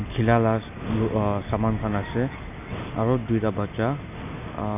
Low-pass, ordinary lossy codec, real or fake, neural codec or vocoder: 3.6 kHz; none; real; none